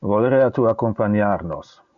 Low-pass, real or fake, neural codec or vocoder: 7.2 kHz; real; none